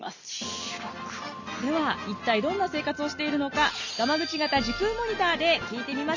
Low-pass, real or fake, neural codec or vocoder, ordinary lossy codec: 7.2 kHz; real; none; none